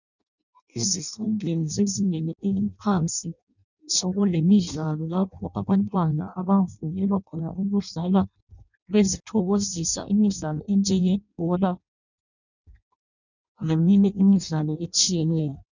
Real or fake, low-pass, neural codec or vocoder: fake; 7.2 kHz; codec, 16 kHz in and 24 kHz out, 0.6 kbps, FireRedTTS-2 codec